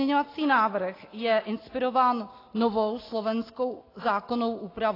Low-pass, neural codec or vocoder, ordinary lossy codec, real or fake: 5.4 kHz; none; AAC, 24 kbps; real